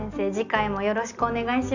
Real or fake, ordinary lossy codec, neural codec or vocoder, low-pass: real; none; none; 7.2 kHz